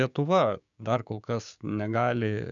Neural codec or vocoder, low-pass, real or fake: codec, 16 kHz, 6 kbps, DAC; 7.2 kHz; fake